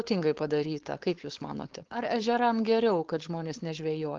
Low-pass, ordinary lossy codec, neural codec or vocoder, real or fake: 7.2 kHz; Opus, 16 kbps; codec, 16 kHz, 4.8 kbps, FACodec; fake